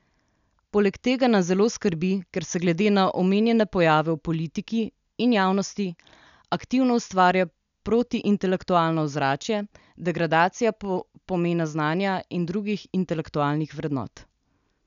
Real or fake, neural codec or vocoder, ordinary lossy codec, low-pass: real; none; none; 7.2 kHz